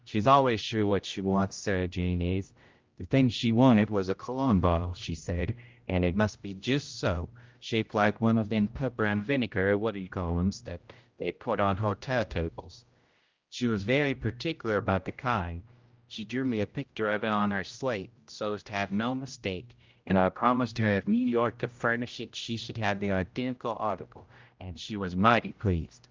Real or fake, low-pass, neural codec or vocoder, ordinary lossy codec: fake; 7.2 kHz; codec, 16 kHz, 0.5 kbps, X-Codec, HuBERT features, trained on general audio; Opus, 24 kbps